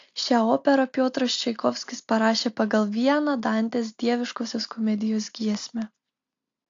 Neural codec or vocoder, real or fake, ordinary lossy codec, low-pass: none; real; AAC, 48 kbps; 7.2 kHz